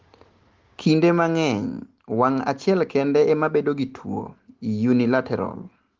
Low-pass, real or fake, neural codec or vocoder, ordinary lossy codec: 7.2 kHz; real; none; Opus, 16 kbps